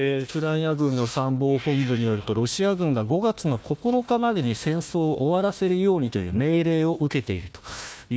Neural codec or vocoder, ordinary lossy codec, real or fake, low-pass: codec, 16 kHz, 1 kbps, FunCodec, trained on Chinese and English, 50 frames a second; none; fake; none